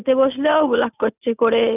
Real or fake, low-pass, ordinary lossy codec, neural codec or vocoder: real; 3.6 kHz; none; none